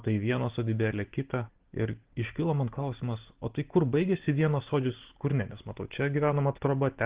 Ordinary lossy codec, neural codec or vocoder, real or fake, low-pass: Opus, 16 kbps; none; real; 3.6 kHz